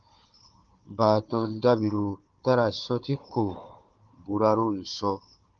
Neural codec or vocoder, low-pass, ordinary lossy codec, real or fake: codec, 16 kHz, 4 kbps, FunCodec, trained on Chinese and English, 50 frames a second; 7.2 kHz; Opus, 32 kbps; fake